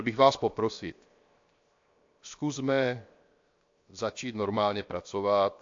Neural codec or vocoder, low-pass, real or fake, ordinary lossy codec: codec, 16 kHz, 0.7 kbps, FocalCodec; 7.2 kHz; fake; AAC, 48 kbps